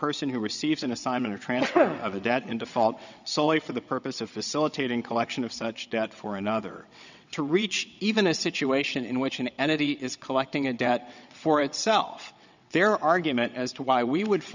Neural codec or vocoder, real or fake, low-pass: vocoder, 22.05 kHz, 80 mel bands, WaveNeXt; fake; 7.2 kHz